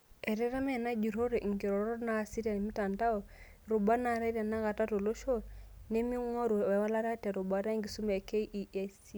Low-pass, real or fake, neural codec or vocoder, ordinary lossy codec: none; real; none; none